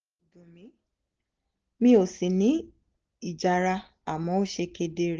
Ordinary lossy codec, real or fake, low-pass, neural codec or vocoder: Opus, 16 kbps; real; 7.2 kHz; none